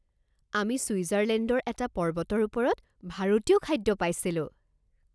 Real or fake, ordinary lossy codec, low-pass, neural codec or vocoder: real; none; none; none